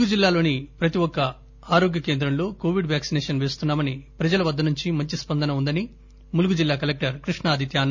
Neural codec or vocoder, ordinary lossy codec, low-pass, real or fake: none; none; 7.2 kHz; real